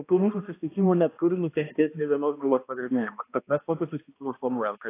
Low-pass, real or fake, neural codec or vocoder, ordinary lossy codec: 3.6 kHz; fake; codec, 16 kHz, 1 kbps, X-Codec, HuBERT features, trained on balanced general audio; AAC, 24 kbps